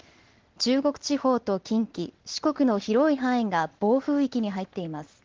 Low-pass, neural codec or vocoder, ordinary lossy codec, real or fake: 7.2 kHz; none; Opus, 16 kbps; real